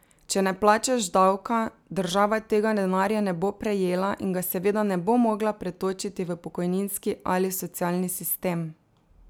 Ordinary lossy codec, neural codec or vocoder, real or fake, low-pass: none; none; real; none